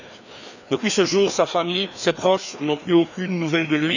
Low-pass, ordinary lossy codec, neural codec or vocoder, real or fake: 7.2 kHz; none; codec, 16 kHz, 2 kbps, FreqCodec, larger model; fake